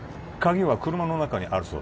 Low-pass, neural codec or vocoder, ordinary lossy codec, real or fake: none; none; none; real